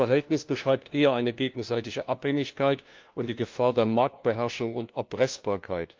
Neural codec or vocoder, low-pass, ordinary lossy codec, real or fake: codec, 16 kHz, 1 kbps, FunCodec, trained on LibriTTS, 50 frames a second; 7.2 kHz; Opus, 32 kbps; fake